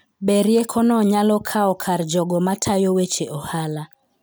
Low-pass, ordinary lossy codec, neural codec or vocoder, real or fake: none; none; none; real